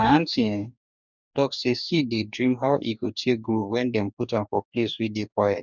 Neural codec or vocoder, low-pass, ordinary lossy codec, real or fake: codec, 44.1 kHz, 2.6 kbps, DAC; 7.2 kHz; none; fake